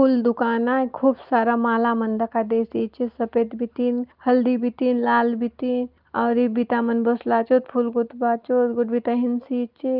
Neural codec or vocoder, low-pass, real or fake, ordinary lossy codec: none; 5.4 kHz; real; Opus, 24 kbps